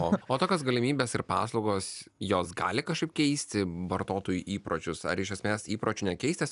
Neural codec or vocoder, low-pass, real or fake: none; 10.8 kHz; real